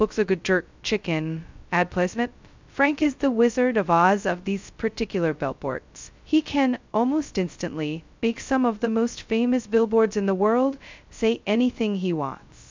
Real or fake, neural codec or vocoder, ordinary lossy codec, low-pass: fake; codec, 16 kHz, 0.2 kbps, FocalCodec; MP3, 64 kbps; 7.2 kHz